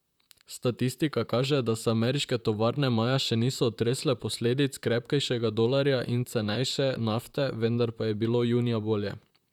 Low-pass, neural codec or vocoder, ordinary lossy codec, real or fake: 19.8 kHz; vocoder, 44.1 kHz, 128 mel bands, Pupu-Vocoder; none; fake